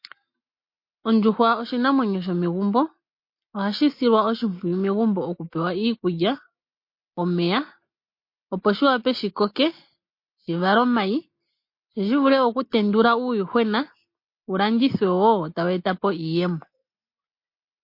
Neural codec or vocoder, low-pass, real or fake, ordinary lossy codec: none; 5.4 kHz; real; MP3, 32 kbps